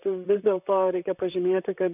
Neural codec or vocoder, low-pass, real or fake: vocoder, 44.1 kHz, 128 mel bands, Pupu-Vocoder; 3.6 kHz; fake